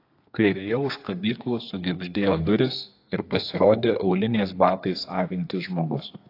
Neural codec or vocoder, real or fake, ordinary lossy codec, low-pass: codec, 32 kHz, 1.9 kbps, SNAC; fake; AAC, 32 kbps; 5.4 kHz